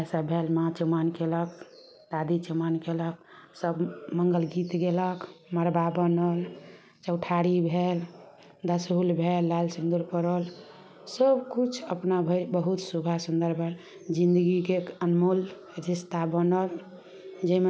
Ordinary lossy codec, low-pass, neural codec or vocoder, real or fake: none; none; none; real